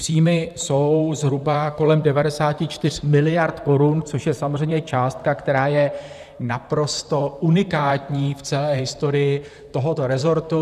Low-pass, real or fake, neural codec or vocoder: 14.4 kHz; fake; vocoder, 44.1 kHz, 128 mel bands, Pupu-Vocoder